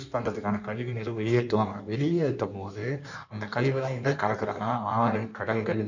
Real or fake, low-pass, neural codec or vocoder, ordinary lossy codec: fake; 7.2 kHz; codec, 16 kHz in and 24 kHz out, 1.1 kbps, FireRedTTS-2 codec; none